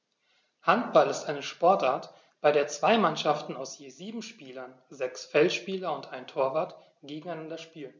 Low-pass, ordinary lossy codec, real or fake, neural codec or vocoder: none; none; real; none